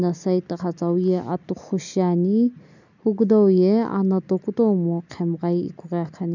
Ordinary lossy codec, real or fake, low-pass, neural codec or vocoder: none; real; 7.2 kHz; none